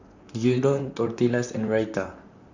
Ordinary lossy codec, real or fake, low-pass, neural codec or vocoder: none; fake; 7.2 kHz; vocoder, 44.1 kHz, 128 mel bands, Pupu-Vocoder